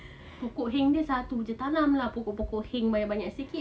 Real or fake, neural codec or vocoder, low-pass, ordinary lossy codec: real; none; none; none